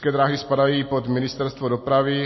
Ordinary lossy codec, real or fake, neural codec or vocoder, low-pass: MP3, 24 kbps; real; none; 7.2 kHz